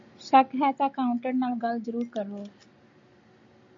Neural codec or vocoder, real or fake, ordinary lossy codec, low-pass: none; real; MP3, 48 kbps; 7.2 kHz